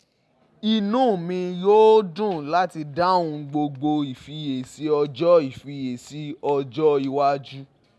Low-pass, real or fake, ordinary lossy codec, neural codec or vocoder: none; real; none; none